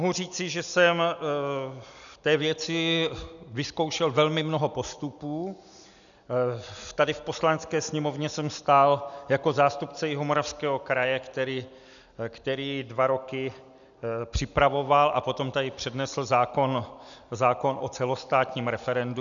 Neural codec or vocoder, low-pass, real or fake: none; 7.2 kHz; real